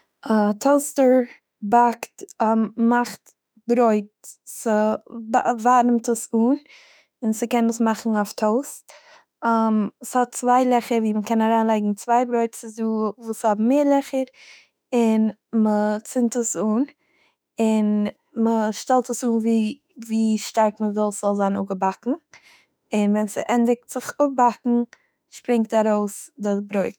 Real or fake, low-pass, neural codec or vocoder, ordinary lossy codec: fake; none; autoencoder, 48 kHz, 32 numbers a frame, DAC-VAE, trained on Japanese speech; none